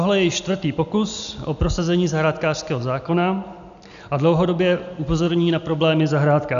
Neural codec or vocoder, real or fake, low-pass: none; real; 7.2 kHz